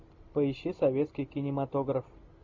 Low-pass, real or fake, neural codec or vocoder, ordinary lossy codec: 7.2 kHz; real; none; MP3, 64 kbps